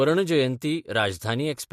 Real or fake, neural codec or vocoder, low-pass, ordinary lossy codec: real; none; 14.4 kHz; MP3, 64 kbps